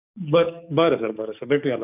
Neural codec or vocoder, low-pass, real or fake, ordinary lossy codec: codec, 44.1 kHz, 7.8 kbps, DAC; 3.6 kHz; fake; none